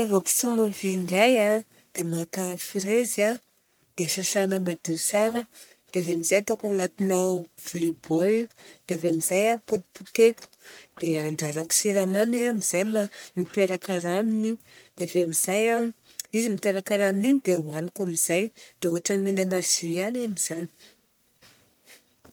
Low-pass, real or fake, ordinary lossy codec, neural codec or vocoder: none; fake; none; codec, 44.1 kHz, 1.7 kbps, Pupu-Codec